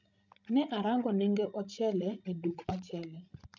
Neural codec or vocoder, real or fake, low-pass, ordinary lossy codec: codec, 16 kHz, 16 kbps, FreqCodec, larger model; fake; 7.2 kHz; none